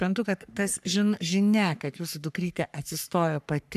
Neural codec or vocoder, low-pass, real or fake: codec, 44.1 kHz, 3.4 kbps, Pupu-Codec; 14.4 kHz; fake